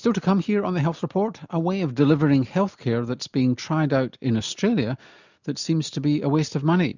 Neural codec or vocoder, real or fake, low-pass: none; real; 7.2 kHz